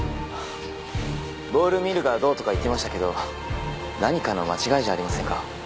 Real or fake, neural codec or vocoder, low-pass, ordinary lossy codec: real; none; none; none